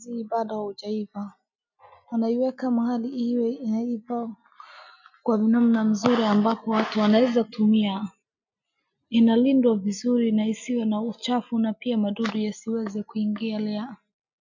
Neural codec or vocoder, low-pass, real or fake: none; 7.2 kHz; real